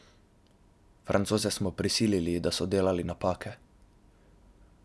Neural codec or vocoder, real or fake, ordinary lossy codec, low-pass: none; real; none; none